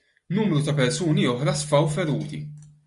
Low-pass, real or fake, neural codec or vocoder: 10.8 kHz; real; none